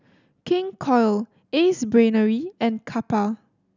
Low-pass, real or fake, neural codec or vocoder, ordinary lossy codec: 7.2 kHz; real; none; none